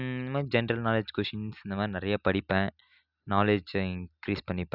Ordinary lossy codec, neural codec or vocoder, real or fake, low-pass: none; none; real; 5.4 kHz